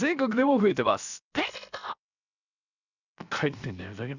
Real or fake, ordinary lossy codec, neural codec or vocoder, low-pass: fake; none; codec, 16 kHz, 0.7 kbps, FocalCodec; 7.2 kHz